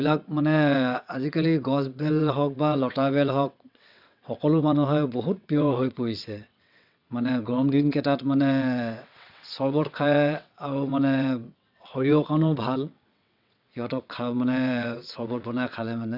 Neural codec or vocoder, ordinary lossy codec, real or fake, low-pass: vocoder, 22.05 kHz, 80 mel bands, WaveNeXt; none; fake; 5.4 kHz